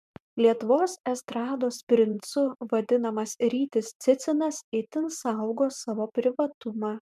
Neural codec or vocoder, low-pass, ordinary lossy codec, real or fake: vocoder, 44.1 kHz, 128 mel bands every 512 samples, BigVGAN v2; 14.4 kHz; MP3, 96 kbps; fake